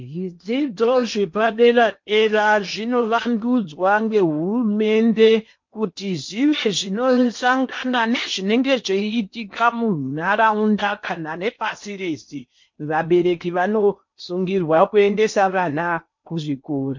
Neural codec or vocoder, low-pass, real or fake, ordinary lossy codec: codec, 16 kHz in and 24 kHz out, 0.8 kbps, FocalCodec, streaming, 65536 codes; 7.2 kHz; fake; MP3, 48 kbps